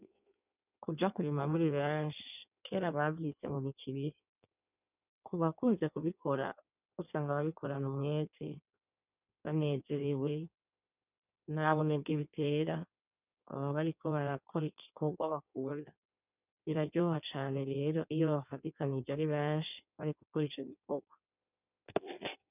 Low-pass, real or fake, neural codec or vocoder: 3.6 kHz; fake; codec, 16 kHz in and 24 kHz out, 1.1 kbps, FireRedTTS-2 codec